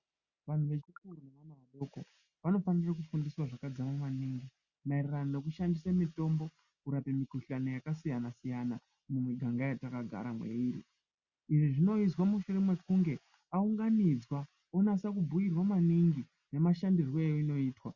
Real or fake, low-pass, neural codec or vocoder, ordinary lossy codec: real; 7.2 kHz; none; Opus, 64 kbps